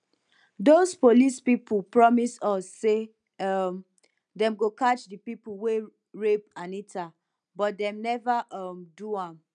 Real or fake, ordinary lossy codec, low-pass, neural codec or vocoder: real; none; 9.9 kHz; none